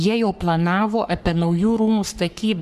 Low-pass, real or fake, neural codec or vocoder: 14.4 kHz; fake; codec, 44.1 kHz, 3.4 kbps, Pupu-Codec